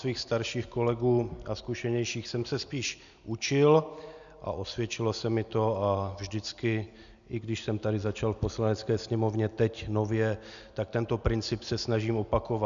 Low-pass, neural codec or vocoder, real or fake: 7.2 kHz; none; real